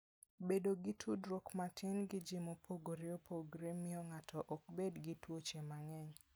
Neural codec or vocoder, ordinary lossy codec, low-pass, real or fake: none; none; none; real